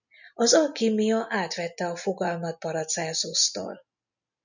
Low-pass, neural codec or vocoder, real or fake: 7.2 kHz; none; real